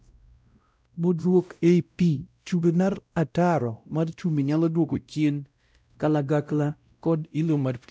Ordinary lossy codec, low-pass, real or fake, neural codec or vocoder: none; none; fake; codec, 16 kHz, 0.5 kbps, X-Codec, WavLM features, trained on Multilingual LibriSpeech